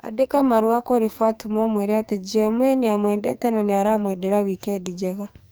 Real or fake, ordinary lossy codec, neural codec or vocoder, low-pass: fake; none; codec, 44.1 kHz, 2.6 kbps, SNAC; none